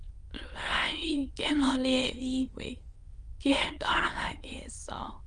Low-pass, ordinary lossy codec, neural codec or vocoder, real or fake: 9.9 kHz; Opus, 32 kbps; autoencoder, 22.05 kHz, a latent of 192 numbers a frame, VITS, trained on many speakers; fake